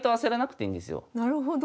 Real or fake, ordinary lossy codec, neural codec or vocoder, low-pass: real; none; none; none